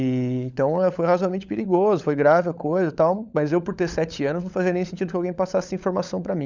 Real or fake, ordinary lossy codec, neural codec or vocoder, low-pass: fake; none; codec, 16 kHz, 16 kbps, FunCodec, trained on LibriTTS, 50 frames a second; 7.2 kHz